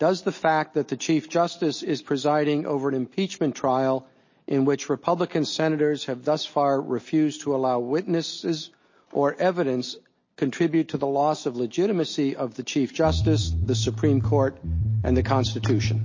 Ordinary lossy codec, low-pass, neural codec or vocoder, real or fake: MP3, 32 kbps; 7.2 kHz; none; real